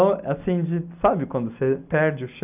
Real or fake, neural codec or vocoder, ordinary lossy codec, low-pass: real; none; none; 3.6 kHz